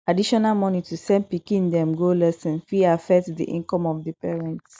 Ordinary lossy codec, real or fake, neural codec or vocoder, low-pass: none; real; none; none